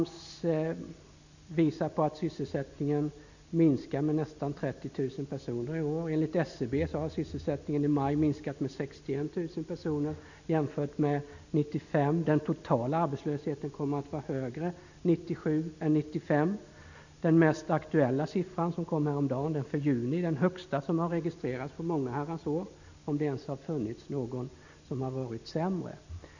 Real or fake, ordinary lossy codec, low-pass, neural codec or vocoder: real; none; 7.2 kHz; none